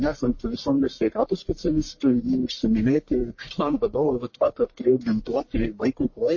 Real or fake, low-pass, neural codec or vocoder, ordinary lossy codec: fake; 7.2 kHz; codec, 44.1 kHz, 1.7 kbps, Pupu-Codec; MP3, 32 kbps